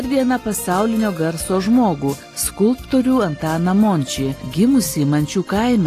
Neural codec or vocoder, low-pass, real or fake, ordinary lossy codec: none; 14.4 kHz; real; AAC, 48 kbps